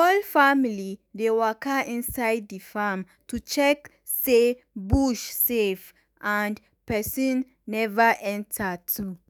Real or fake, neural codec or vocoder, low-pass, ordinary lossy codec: fake; autoencoder, 48 kHz, 128 numbers a frame, DAC-VAE, trained on Japanese speech; none; none